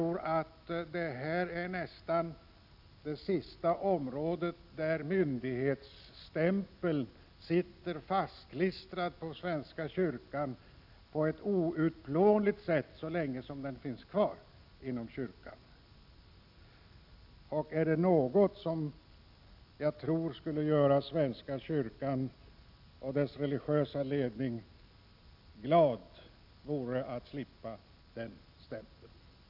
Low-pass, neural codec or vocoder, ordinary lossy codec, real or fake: 5.4 kHz; none; none; real